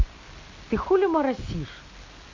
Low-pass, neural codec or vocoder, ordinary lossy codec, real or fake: 7.2 kHz; none; MP3, 48 kbps; real